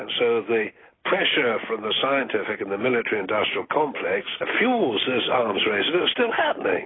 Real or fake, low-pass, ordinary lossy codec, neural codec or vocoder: real; 7.2 kHz; AAC, 16 kbps; none